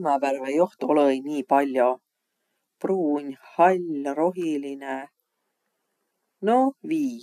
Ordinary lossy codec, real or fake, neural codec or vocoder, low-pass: none; real; none; 14.4 kHz